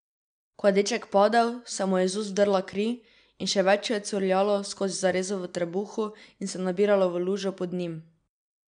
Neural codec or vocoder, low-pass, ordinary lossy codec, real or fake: none; 9.9 kHz; none; real